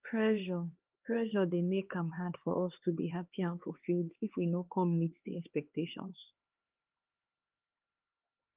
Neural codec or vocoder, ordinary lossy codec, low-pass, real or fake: codec, 16 kHz, 4 kbps, X-Codec, HuBERT features, trained on LibriSpeech; Opus, 32 kbps; 3.6 kHz; fake